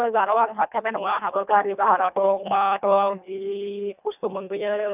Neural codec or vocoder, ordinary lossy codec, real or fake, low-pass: codec, 24 kHz, 1.5 kbps, HILCodec; none; fake; 3.6 kHz